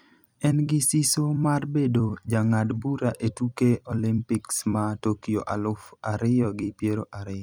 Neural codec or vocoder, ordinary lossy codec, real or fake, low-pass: vocoder, 44.1 kHz, 128 mel bands every 256 samples, BigVGAN v2; none; fake; none